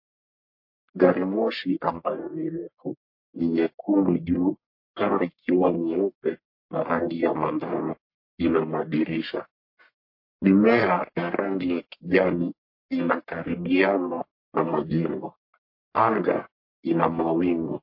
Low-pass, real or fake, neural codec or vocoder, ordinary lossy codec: 5.4 kHz; fake; codec, 44.1 kHz, 1.7 kbps, Pupu-Codec; MP3, 32 kbps